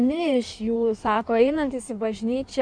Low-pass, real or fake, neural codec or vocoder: 9.9 kHz; fake; codec, 16 kHz in and 24 kHz out, 1.1 kbps, FireRedTTS-2 codec